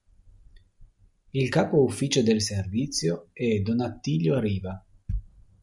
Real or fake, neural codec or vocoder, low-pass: real; none; 10.8 kHz